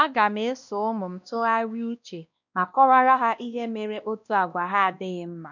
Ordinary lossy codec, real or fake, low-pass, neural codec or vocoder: none; fake; 7.2 kHz; codec, 16 kHz, 1 kbps, X-Codec, WavLM features, trained on Multilingual LibriSpeech